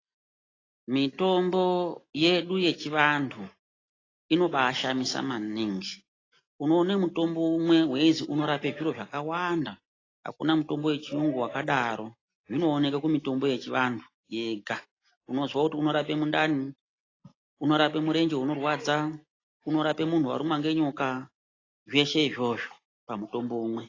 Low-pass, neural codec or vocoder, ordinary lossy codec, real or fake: 7.2 kHz; none; AAC, 32 kbps; real